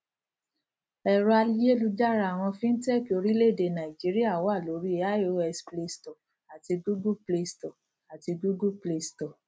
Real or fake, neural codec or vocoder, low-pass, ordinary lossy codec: real; none; none; none